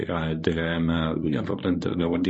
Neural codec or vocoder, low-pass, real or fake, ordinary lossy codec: codec, 24 kHz, 0.9 kbps, WavTokenizer, medium speech release version 1; 10.8 kHz; fake; MP3, 32 kbps